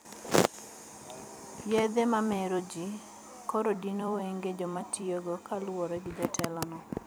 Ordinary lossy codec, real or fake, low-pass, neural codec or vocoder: none; fake; none; vocoder, 44.1 kHz, 128 mel bands every 256 samples, BigVGAN v2